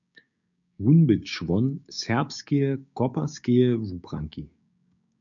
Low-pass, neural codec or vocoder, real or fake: 7.2 kHz; codec, 16 kHz, 6 kbps, DAC; fake